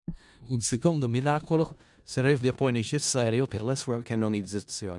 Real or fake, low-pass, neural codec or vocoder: fake; 10.8 kHz; codec, 16 kHz in and 24 kHz out, 0.4 kbps, LongCat-Audio-Codec, four codebook decoder